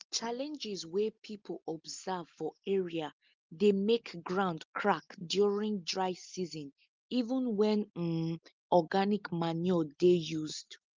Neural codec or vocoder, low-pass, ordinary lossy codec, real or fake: none; 7.2 kHz; Opus, 32 kbps; real